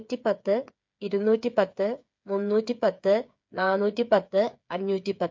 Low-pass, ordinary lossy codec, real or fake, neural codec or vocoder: 7.2 kHz; MP3, 48 kbps; fake; codec, 16 kHz, 8 kbps, FreqCodec, smaller model